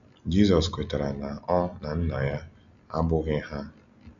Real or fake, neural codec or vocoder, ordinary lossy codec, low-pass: real; none; none; 7.2 kHz